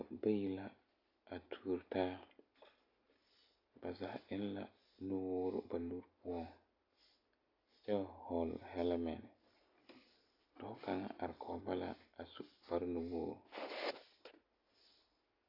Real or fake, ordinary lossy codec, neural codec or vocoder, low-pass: real; AAC, 32 kbps; none; 5.4 kHz